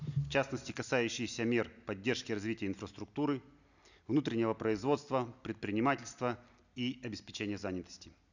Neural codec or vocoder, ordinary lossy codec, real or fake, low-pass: none; none; real; 7.2 kHz